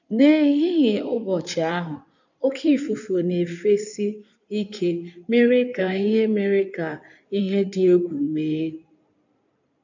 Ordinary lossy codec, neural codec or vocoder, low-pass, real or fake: none; codec, 16 kHz in and 24 kHz out, 2.2 kbps, FireRedTTS-2 codec; 7.2 kHz; fake